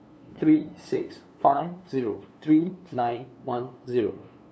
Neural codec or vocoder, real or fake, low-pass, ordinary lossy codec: codec, 16 kHz, 2 kbps, FunCodec, trained on LibriTTS, 25 frames a second; fake; none; none